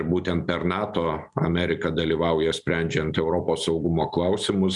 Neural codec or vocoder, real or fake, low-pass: none; real; 10.8 kHz